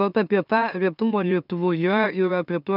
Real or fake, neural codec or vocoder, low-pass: fake; autoencoder, 44.1 kHz, a latent of 192 numbers a frame, MeloTTS; 5.4 kHz